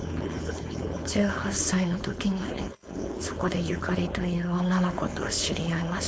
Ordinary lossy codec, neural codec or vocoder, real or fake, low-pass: none; codec, 16 kHz, 4.8 kbps, FACodec; fake; none